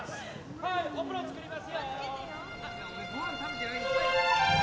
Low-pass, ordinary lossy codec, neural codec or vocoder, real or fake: none; none; none; real